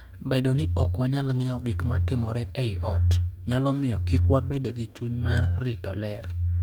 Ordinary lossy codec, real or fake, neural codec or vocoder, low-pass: none; fake; codec, 44.1 kHz, 2.6 kbps, DAC; 19.8 kHz